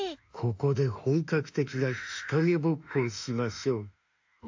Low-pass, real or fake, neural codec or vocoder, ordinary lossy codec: 7.2 kHz; fake; autoencoder, 48 kHz, 32 numbers a frame, DAC-VAE, trained on Japanese speech; AAC, 48 kbps